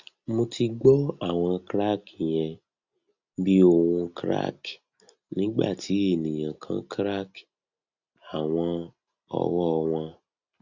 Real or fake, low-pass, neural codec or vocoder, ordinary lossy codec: real; 7.2 kHz; none; Opus, 64 kbps